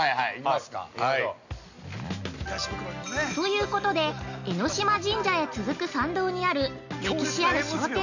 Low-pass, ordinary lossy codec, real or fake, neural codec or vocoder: 7.2 kHz; none; real; none